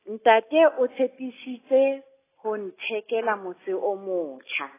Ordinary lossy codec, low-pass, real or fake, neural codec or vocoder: AAC, 16 kbps; 3.6 kHz; real; none